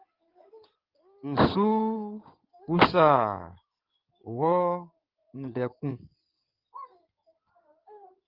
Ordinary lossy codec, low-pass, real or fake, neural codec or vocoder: Opus, 24 kbps; 5.4 kHz; fake; codec, 16 kHz in and 24 kHz out, 2.2 kbps, FireRedTTS-2 codec